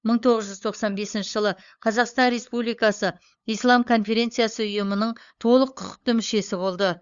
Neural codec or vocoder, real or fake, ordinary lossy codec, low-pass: codec, 16 kHz, 8 kbps, FunCodec, trained on LibriTTS, 25 frames a second; fake; Opus, 64 kbps; 7.2 kHz